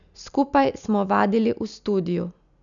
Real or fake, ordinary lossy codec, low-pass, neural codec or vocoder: real; none; 7.2 kHz; none